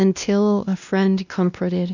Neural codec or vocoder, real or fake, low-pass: codec, 16 kHz, 1 kbps, X-Codec, WavLM features, trained on Multilingual LibriSpeech; fake; 7.2 kHz